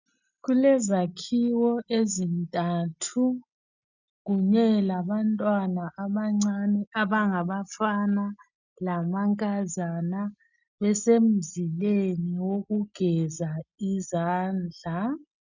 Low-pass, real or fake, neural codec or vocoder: 7.2 kHz; real; none